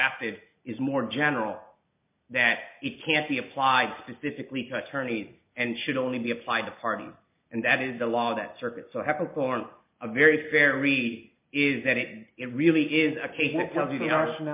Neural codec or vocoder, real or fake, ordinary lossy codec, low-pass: none; real; MP3, 32 kbps; 3.6 kHz